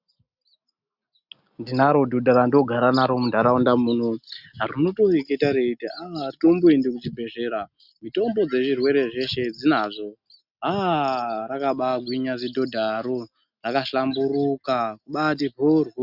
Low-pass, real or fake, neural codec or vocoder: 5.4 kHz; real; none